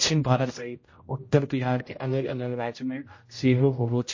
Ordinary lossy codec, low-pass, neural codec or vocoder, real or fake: MP3, 32 kbps; 7.2 kHz; codec, 16 kHz, 0.5 kbps, X-Codec, HuBERT features, trained on general audio; fake